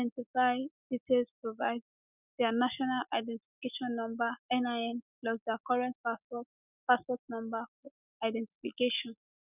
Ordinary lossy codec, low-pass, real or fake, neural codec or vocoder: none; 3.6 kHz; real; none